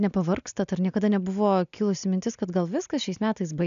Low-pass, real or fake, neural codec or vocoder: 7.2 kHz; real; none